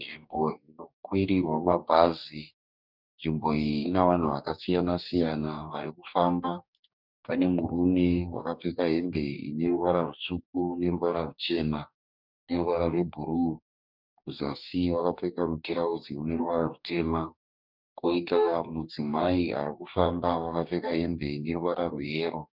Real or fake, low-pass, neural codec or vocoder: fake; 5.4 kHz; codec, 44.1 kHz, 2.6 kbps, DAC